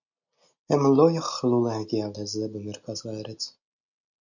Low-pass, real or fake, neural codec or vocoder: 7.2 kHz; real; none